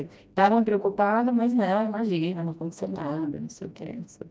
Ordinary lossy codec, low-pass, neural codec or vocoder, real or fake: none; none; codec, 16 kHz, 1 kbps, FreqCodec, smaller model; fake